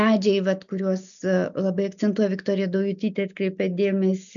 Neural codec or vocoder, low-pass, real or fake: none; 7.2 kHz; real